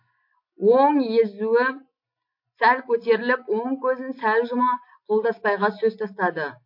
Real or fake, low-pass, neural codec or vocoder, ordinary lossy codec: real; 5.4 kHz; none; MP3, 32 kbps